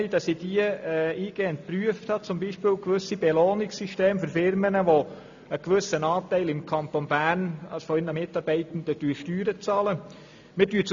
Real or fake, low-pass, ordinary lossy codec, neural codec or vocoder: real; 7.2 kHz; AAC, 64 kbps; none